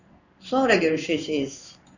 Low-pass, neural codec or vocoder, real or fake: 7.2 kHz; codec, 16 kHz in and 24 kHz out, 1 kbps, XY-Tokenizer; fake